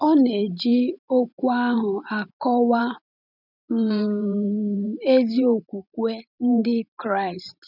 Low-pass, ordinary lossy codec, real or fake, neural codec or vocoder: 5.4 kHz; none; fake; vocoder, 44.1 kHz, 128 mel bands every 512 samples, BigVGAN v2